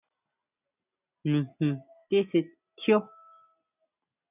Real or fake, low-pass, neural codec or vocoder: real; 3.6 kHz; none